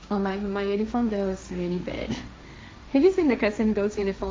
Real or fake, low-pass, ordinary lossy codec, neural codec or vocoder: fake; none; none; codec, 16 kHz, 1.1 kbps, Voila-Tokenizer